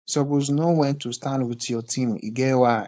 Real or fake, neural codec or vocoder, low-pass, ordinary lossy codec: fake; codec, 16 kHz, 4.8 kbps, FACodec; none; none